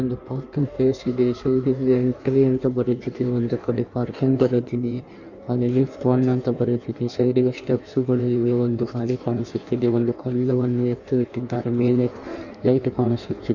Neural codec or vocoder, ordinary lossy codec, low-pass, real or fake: codec, 16 kHz in and 24 kHz out, 1.1 kbps, FireRedTTS-2 codec; none; 7.2 kHz; fake